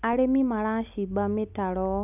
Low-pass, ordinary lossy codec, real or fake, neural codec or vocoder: 3.6 kHz; none; real; none